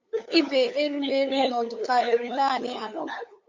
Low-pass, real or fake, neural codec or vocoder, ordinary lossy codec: 7.2 kHz; fake; codec, 16 kHz, 8 kbps, FunCodec, trained on LibriTTS, 25 frames a second; MP3, 48 kbps